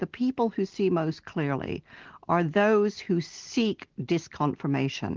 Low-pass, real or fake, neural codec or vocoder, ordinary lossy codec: 7.2 kHz; real; none; Opus, 16 kbps